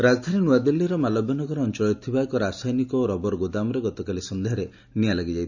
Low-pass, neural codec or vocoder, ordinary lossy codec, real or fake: 7.2 kHz; none; none; real